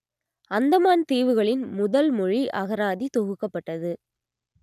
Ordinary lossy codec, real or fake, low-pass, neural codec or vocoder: none; real; 14.4 kHz; none